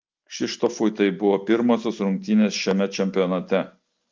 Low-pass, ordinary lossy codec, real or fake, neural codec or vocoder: 7.2 kHz; Opus, 24 kbps; real; none